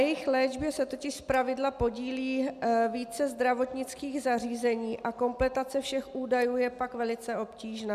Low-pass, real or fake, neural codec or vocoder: 14.4 kHz; real; none